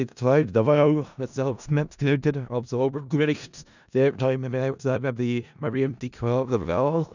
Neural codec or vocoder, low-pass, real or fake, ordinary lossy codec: codec, 16 kHz in and 24 kHz out, 0.4 kbps, LongCat-Audio-Codec, four codebook decoder; 7.2 kHz; fake; none